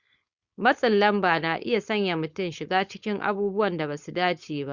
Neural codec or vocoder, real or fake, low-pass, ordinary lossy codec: codec, 16 kHz, 4.8 kbps, FACodec; fake; 7.2 kHz; Opus, 64 kbps